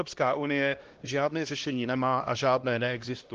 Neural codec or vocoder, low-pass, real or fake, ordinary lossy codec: codec, 16 kHz, 1 kbps, X-Codec, HuBERT features, trained on LibriSpeech; 7.2 kHz; fake; Opus, 16 kbps